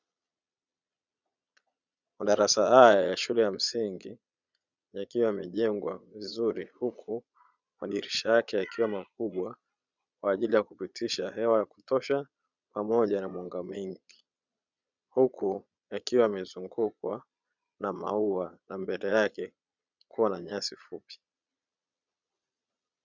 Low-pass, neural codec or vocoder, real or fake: 7.2 kHz; vocoder, 22.05 kHz, 80 mel bands, Vocos; fake